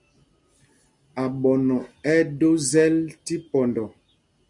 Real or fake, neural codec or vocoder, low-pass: real; none; 10.8 kHz